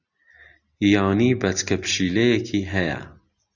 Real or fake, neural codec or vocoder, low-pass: real; none; 7.2 kHz